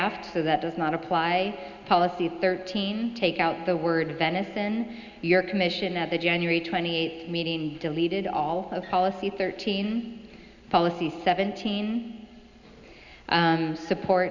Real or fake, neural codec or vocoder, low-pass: real; none; 7.2 kHz